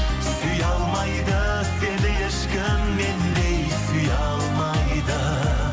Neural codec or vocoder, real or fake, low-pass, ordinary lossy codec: none; real; none; none